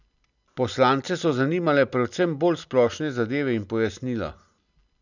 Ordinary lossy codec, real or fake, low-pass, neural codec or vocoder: none; real; 7.2 kHz; none